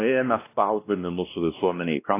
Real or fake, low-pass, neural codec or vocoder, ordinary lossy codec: fake; 3.6 kHz; codec, 16 kHz, 1 kbps, X-Codec, WavLM features, trained on Multilingual LibriSpeech; AAC, 24 kbps